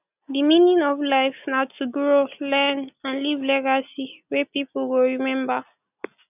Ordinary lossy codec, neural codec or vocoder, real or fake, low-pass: none; none; real; 3.6 kHz